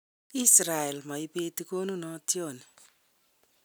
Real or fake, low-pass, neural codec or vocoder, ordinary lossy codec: real; none; none; none